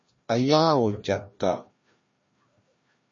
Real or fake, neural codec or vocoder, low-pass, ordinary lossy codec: fake; codec, 16 kHz, 1 kbps, FreqCodec, larger model; 7.2 kHz; MP3, 32 kbps